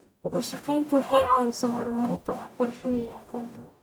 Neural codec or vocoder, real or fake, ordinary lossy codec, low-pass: codec, 44.1 kHz, 0.9 kbps, DAC; fake; none; none